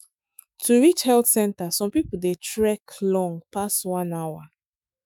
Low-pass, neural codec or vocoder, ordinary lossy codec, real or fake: none; autoencoder, 48 kHz, 128 numbers a frame, DAC-VAE, trained on Japanese speech; none; fake